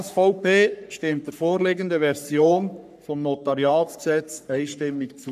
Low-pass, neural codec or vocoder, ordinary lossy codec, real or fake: 14.4 kHz; codec, 44.1 kHz, 3.4 kbps, Pupu-Codec; none; fake